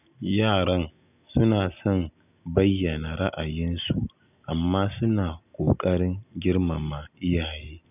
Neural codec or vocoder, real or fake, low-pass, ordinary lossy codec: none; real; 3.6 kHz; none